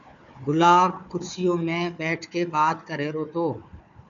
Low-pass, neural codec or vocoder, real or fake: 7.2 kHz; codec, 16 kHz, 4 kbps, FunCodec, trained on Chinese and English, 50 frames a second; fake